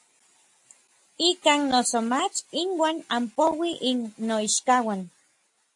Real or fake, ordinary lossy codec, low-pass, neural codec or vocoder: real; AAC, 64 kbps; 10.8 kHz; none